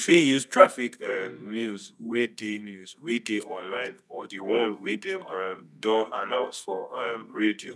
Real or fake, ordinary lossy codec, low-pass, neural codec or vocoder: fake; none; none; codec, 24 kHz, 0.9 kbps, WavTokenizer, medium music audio release